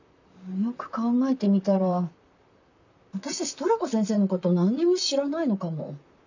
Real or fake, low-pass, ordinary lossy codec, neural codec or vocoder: fake; 7.2 kHz; none; vocoder, 44.1 kHz, 128 mel bands, Pupu-Vocoder